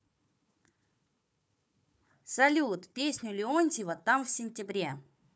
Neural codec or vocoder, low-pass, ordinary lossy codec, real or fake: codec, 16 kHz, 4 kbps, FunCodec, trained on Chinese and English, 50 frames a second; none; none; fake